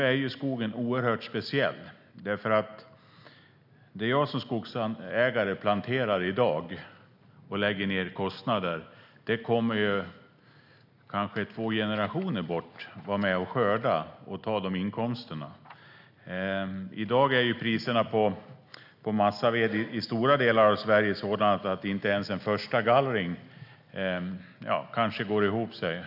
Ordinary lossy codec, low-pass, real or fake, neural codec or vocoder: none; 5.4 kHz; real; none